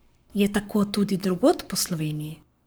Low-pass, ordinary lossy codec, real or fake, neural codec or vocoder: none; none; fake; codec, 44.1 kHz, 7.8 kbps, Pupu-Codec